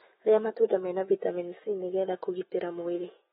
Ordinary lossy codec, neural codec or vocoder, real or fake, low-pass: AAC, 16 kbps; autoencoder, 48 kHz, 128 numbers a frame, DAC-VAE, trained on Japanese speech; fake; 19.8 kHz